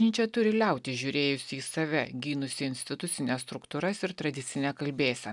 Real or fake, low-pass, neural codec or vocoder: real; 10.8 kHz; none